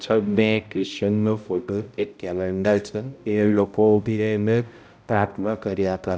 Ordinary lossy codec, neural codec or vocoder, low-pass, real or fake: none; codec, 16 kHz, 0.5 kbps, X-Codec, HuBERT features, trained on balanced general audio; none; fake